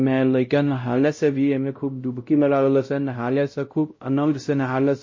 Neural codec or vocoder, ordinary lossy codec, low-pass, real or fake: codec, 16 kHz, 0.5 kbps, X-Codec, WavLM features, trained on Multilingual LibriSpeech; MP3, 32 kbps; 7.2 kHz; fake